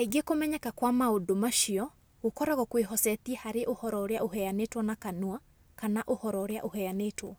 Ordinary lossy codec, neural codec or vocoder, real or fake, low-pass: none; none; real; none